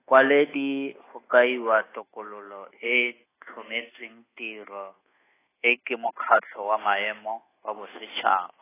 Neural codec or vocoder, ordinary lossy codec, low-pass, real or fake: codec, 24 kHz, 1.2 kbps, DualCodec; AAC, 16 kbps; 3.6 kHz; fake